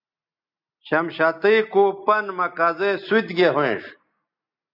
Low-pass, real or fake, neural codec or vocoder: 5.4 kHz; real; none